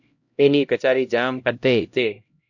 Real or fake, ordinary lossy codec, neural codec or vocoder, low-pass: fake; MP3, 48 kbps; codec, 16 kHz, 0.5 kbps, X-Codec, HuBERT features, trained on LibriSpeech; 7.2 kHz